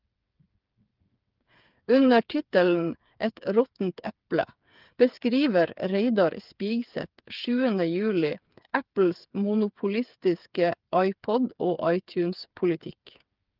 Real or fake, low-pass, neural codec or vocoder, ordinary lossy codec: fake; 5.4 kHz; codec, 16 kHz, 8 kbps, FreqCodec, smaller model; Opus, 32 kbps